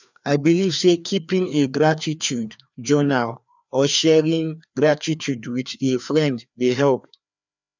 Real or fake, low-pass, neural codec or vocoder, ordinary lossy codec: fake; 7.2 kHz; codec, 16 kHz, 2 kbps, FreqCodec, larger model; none